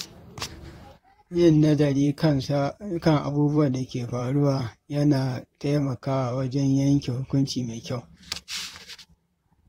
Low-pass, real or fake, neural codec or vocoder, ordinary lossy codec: 19.8 kHz; fake; vocoder, 44.1 kHz, 128 mel bands, Pupu-Vocoder; AAC, 48 kbps